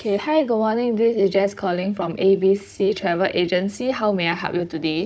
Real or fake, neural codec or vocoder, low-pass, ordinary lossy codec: fake; codec, 16 kHz, 16 kbps, FunCodec, trained on Chinese and English, 50 frames a second; none; none